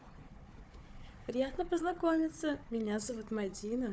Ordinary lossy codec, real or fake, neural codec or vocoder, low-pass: none; fake; codec, 16 kHz, 4 kbps, FunCodec, trained on Chinese and English, 50 frames a second; none